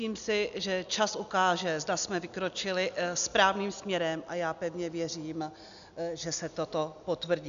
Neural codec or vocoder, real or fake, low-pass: none; real; 7.2 kHz